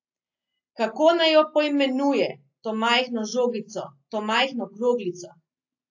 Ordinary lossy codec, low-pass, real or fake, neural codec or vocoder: AAC, 48 kbps; 7.2 kHz; real; none